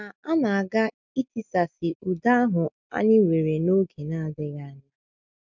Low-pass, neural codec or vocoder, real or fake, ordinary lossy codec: 7.2 kHz; none; real; none